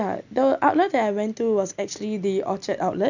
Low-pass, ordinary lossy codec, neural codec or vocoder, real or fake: 7.2 kHz; none; none; real